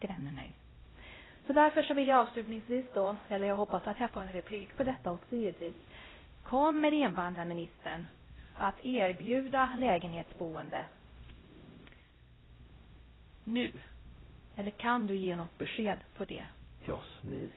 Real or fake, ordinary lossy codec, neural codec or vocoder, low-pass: fake; AAC, 16 kbps; codec, 16 kHz, 0.5 kbps, X-Codec, HuBERT features, trained on LibriSpeech; 7.2 kHz